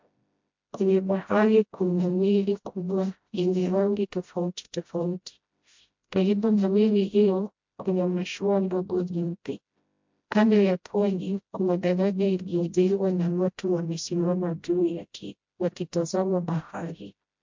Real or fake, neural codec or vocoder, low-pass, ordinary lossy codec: fake; codec, 16 kHz, 0.5 kbps, FreqCodec, smaller model; 7.2 kHz; MP3, 48 kbps